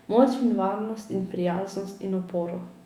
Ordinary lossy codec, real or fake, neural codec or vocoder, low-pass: Opus, 64 kbps; fake; autoencoder, 48 kHz, 128 numbers a frame, DAC-VAE, trained on Japanese speech; 19.8 kHz